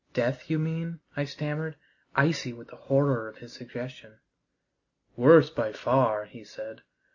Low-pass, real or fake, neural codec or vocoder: 7.2 kHz; real; none